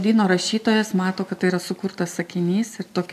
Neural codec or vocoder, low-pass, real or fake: none; 14.4 kHz; real